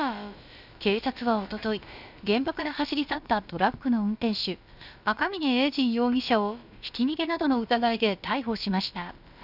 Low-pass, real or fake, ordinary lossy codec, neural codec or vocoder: 5.4 kHz; fake; none; codec, 16 kHz, about 1 kbps, DyCAST, with the encoder's durations